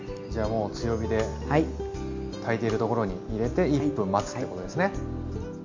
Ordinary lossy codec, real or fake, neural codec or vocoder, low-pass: MP3, 48 kbps; real; none; 7.2 kHz